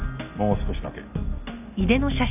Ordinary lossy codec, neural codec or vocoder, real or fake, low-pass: none; none; real; 3.6 kHz